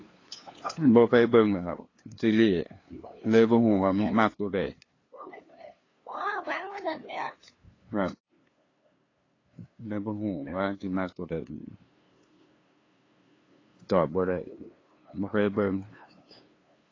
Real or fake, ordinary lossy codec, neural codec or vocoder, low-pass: fake; AAC, 32 kbps; codec, 16 kHz, 2 kbps, FunCodec, trained on LibriTTS, 25 frames a second; 7.2 kHz